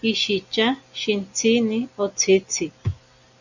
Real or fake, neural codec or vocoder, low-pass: real; none; 7.2 kHz